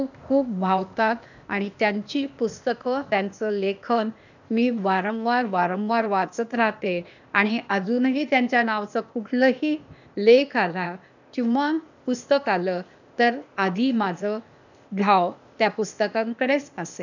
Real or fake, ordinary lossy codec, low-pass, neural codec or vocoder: fake; none; 7.2 kHz; codec, 16 kHz, 0.8 kbps, ZipCodec